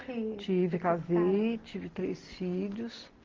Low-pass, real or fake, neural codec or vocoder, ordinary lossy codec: 7.2 kHz; real; none; Opus, 16 kbps